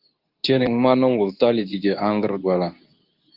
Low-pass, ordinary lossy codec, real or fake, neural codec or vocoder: 5.4 kHz; Opus, 24 kbps; fake; codec, 24 kHz, 0.9 kbps, WavTokenizer, medium speech release version 2